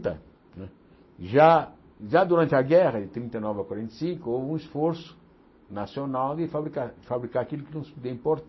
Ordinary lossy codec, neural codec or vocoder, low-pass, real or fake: MP3, 24 kbps; none; 7.2 kHz; real